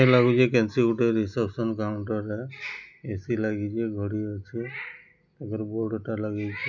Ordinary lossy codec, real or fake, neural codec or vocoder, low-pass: none; real; none; 7.2 kHz